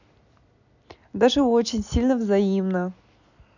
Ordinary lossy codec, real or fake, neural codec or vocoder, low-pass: none; real; none; 7.2 kHz